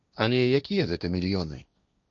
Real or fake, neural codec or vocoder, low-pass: fake; codec, 16 kHz, 1.1 kbps, Voila-Tokenizer; 7.2 kHz